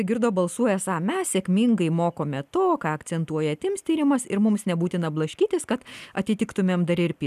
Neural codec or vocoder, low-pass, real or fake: none; 14.4 kHz; real